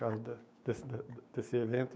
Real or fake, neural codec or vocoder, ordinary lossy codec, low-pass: fake; codec, 16 kHz, 6 kbps, DAC; none; none